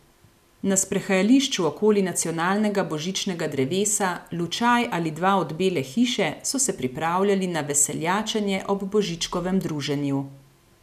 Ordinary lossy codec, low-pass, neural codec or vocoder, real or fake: none; 14.4 kHz; none; real